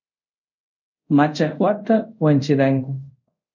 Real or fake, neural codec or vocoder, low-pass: fake; codec, 24 kHz, 0.5 kbps, DualCodec; 7.2 kHz